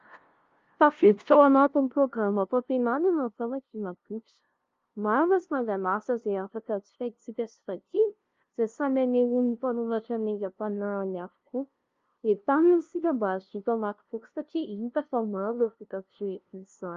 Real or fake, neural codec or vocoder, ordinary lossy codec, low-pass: fake; codec, 16 kHz, 0.5 kbps, FunCodec, trained on LibriTTS, 25 frames a second; Opus, 32 kbps; 7.2 kHz